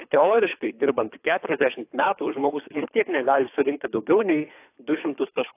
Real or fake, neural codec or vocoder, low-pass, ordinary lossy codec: fake; codec, 24 kHz, 3 kbps, HILCodec; 3.6 kHz; AAC, 24 kbps